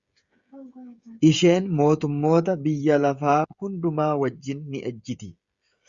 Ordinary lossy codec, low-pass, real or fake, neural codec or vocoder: Opus, 64 kbps; 7.2 kHz; fake; codec, 16 kHz, 16 kbps, FreqCodec, smaller model